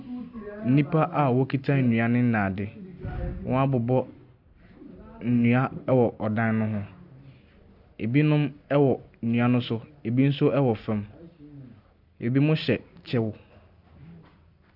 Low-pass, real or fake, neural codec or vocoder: 5.4 kHz; real; none